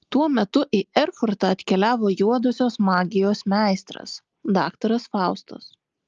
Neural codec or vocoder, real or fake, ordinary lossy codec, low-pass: none; real; Opus, 24 kbps; 7.2 kHz